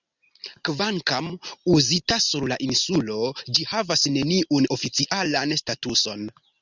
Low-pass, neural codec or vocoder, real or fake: 7.2 kHz; none; real